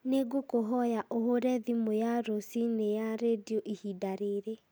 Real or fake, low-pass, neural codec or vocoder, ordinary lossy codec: real; none; none; none